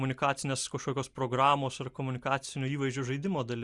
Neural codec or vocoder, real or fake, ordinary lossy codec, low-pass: none; real; Opus, 64 kbps; 10.8 kHz